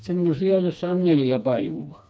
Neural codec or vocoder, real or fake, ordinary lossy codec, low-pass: codec, 16 kHz, 2 kbps, FreqCodec, smaller model; fake; none; none